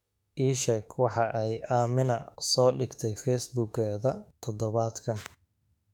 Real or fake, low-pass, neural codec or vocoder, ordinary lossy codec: fake; 19.8 kHz; autoencoder, 48 kHz, 32 numbers a frame, DAC-VAE, trained on Japanese speech; none